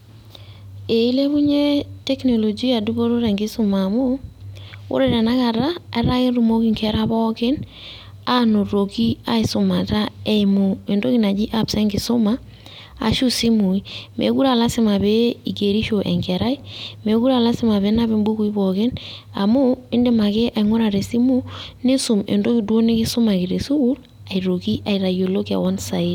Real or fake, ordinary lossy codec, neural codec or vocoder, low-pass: real; none; none; 19.8 kHz